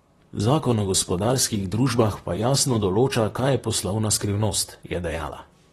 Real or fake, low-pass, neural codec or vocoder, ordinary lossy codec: fake; 19.8 kHz; vocoder, 44.1 kHz, 128 mel bands, Pupu-Vocoder; AAC, 32 kbps